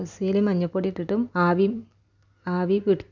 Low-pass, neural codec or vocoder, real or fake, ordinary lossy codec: 7.2 kHz; none; real; none